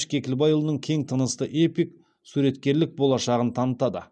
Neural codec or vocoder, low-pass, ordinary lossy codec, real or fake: none; 9.9 kHz; none; real